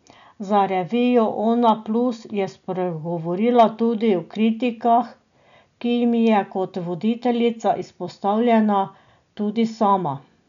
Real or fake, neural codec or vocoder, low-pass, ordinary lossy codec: real; none; 7.2 kHz; none